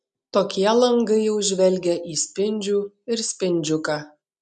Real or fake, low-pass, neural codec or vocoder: real; 10.8 kHz; none